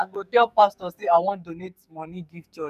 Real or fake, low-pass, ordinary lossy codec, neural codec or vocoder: fake; 14.4 kHz; none; codec, 44.1 kHz, 2.6 kbps, SNAC